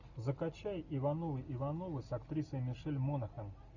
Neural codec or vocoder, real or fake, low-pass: none; real; 7.2 kHz